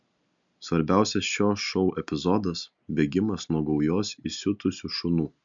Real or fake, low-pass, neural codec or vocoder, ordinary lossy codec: real; 7.2 kHz; none; MP3, 64 kbps